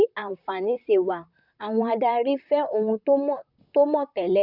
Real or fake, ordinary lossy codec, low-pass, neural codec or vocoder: fake; none; 5.4 kHz; vocoder, 44.1 kHz, 128 mel bands, Pupu-Vocoder